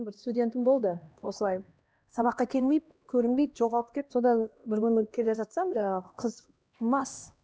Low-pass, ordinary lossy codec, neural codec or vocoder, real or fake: none; none; codec, 16 kHz, 1 kbps, X-Codec, HuBERT features, trained on LibriSpeech; fake